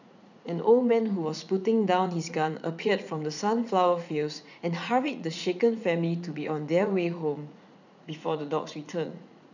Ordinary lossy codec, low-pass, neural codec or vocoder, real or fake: none; 7.2 kHz; vocoder, 44.1 kHz, 80 mel bands, Vocos; fake